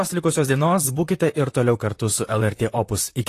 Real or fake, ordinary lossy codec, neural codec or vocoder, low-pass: fake; AAC, 48 kbps; vocoder, 44.1 kHz, 128 mel bands, Pupu-Vocoder; 14.4 kHz